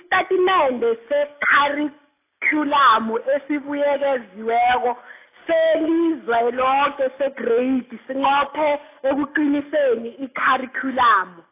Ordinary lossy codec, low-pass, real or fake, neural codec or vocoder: AAC, 24 kbps; 3.6 kHz; real; none